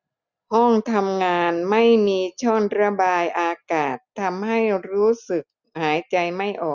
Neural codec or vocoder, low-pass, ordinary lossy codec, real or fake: none; 7.2 kHz; none; real